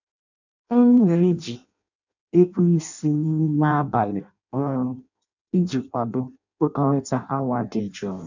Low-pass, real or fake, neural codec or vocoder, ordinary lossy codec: 7.2 kHz; fake; codec, 16 kHz in and 24 kHz out, 0.6 kbps, FireRedTTS-2 codec; none